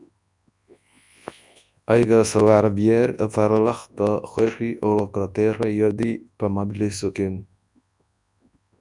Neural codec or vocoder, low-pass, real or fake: codec, 24 kHz, 0.9 kbps, WavTokenizer, large speech release; 10.8 kHz; fake